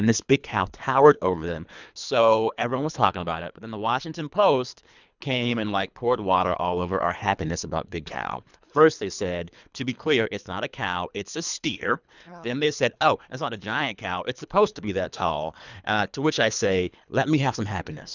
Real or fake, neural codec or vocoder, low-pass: fake; codec, 24 kHz, 3 kbps, HILCodec; 7.2 kHz